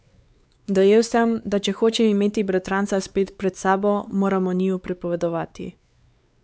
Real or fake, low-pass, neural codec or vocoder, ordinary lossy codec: fake; none; codec, 16 kHz, 4 kbps, X-Codec, WavLM features, trained on Multilingual LibriSpeech; none